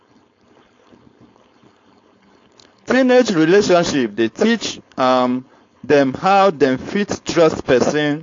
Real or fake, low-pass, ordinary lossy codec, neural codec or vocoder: fake; 7.2 kHz; AAC, 48 kbps; codec, 16 kHz, 4.8 kbps, FACodec